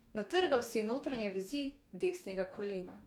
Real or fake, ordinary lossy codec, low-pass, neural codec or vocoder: fake; none; 19.8 kHz; codec, 44.1 kHz, 2.6 kbps, DAC